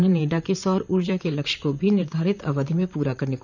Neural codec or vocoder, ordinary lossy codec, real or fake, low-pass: vocoder, 44.1 kHz, 128 mel bands, Pupu-Vocoder; none; fake; 7.2 kHz